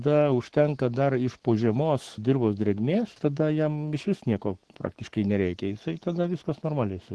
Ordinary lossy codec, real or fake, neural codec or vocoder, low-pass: Opus, 16 kbps; fake; codec, 44.1 kHz, 7.8 kbps, Pupu-Codec; 10.8 kHz